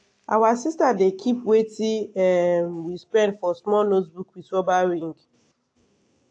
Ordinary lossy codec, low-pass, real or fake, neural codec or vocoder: AAC, 48 kbps; 9.9 kHz; real; none